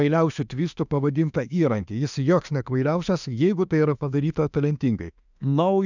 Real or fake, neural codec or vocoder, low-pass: fake; autoencoder, 48 kHz, 32 numbers a frame, DAC-VAE, trained on Japanese speech; 7.2 kHz